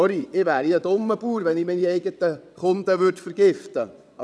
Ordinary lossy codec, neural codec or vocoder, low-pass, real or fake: none; vocoder, 22.05 kHz, 80 mel bands, WaveNeXt; none; fake